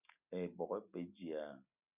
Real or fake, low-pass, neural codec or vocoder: real; 3.6 kHz; none